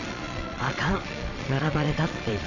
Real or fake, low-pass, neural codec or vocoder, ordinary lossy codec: fake; 7.2 kHz; vocoder, 22.05 kHz, 80 mel bands, Vocos; none